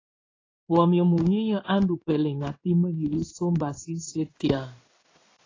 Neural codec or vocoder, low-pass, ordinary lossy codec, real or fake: codec, 16 kHz in and 24 kHz out, 1 kbps, XY-Tokenizer; 7.2 kHz; AAC, 32 kbps; fake